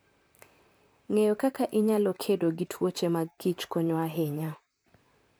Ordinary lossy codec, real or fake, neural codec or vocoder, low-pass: none; fake; vocoder, 44.1 kHz, 128 mel bands, Pupu-Vocoder; none